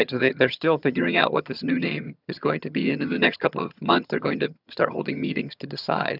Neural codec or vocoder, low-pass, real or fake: vocoder, 22.05 kHz, 80 mel bands, HiFi-GAN; 5.4 kHz; fake